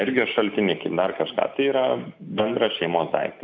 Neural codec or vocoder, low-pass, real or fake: none; 7.2 kHz; real